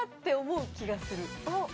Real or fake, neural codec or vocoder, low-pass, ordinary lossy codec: real; none; none; none